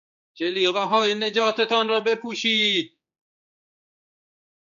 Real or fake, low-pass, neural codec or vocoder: fake; 7.2 kHz; codec, 16 kHz, 4 kbps, X-Codec, HuBERT features, trained on general audio